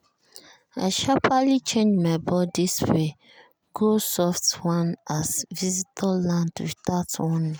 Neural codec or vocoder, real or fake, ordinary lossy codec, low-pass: none; real; none; none